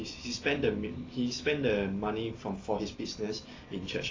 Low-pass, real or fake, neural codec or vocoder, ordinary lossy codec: 7.2 kHz; real; none; AAC, 32 kbps